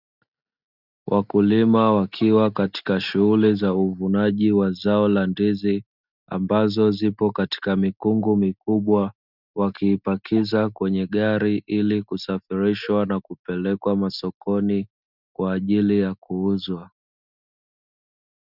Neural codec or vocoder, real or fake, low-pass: none; real; 5.4 kHz